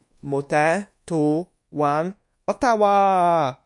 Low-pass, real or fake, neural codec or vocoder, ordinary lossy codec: 10.8 kHz; fake; codec, 24 kHz, 1.2 kbps, DualCodec; MP3, 48 kbps